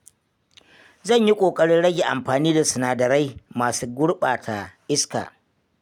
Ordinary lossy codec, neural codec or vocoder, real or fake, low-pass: none; none; real; 19.8 kHz